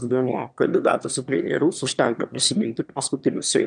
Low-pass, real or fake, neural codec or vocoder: 9.9 kHz; fake; autoencoder, 22.05 kHz, a latent of 192 numbers a frame, VITS, trained on one speaker